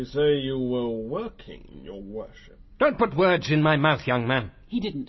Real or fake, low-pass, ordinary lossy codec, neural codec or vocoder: real; 7.2 kHz; MP3, 24 kbps; none